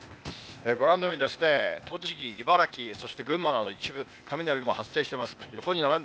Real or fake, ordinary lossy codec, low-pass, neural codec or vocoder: fake; none; none; codec, 16 kHz, 0.8 kbps, ZipCodec